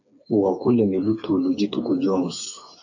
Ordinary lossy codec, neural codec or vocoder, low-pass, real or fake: AAC, 48 kbps; codec, 16 kHz, 4 kbps, FreqCodec, smaller model; 7.2 kHz; fake